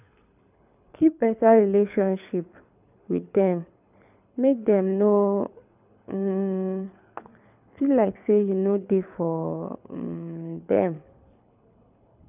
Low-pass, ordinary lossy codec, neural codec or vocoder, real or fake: 3.6 kHz; none; codec, 24 kHz, 6 kbps, HILCodec; fake